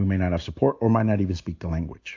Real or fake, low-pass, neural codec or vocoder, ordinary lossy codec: real; 7.2 kHz; none; AAC, 48 kbps